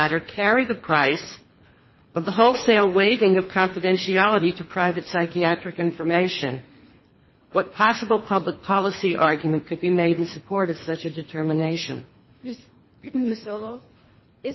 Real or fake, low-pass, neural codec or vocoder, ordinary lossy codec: fake; 7.2 kHz; codec, 24 kHz, 3 kbps, HILCodec; MP3, 24 kbps